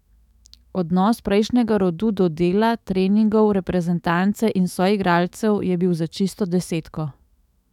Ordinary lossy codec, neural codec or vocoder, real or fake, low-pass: none; autoencoder, 48 kHz, 128 numbers a frame, DAC-VAE, trained on Japanese speech; fake; 19.8 kHz